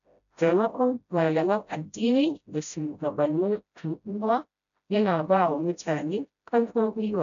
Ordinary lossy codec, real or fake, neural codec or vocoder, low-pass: none; fake; codec, 16 kHz, 0.5 kbps, FreqCodec, smaller model; 7.2 kHz